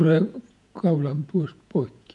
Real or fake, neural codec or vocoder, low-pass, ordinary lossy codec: fake; vocoder, 48 kHz, 128 mel bands, Vocos; 10.8 kHz; none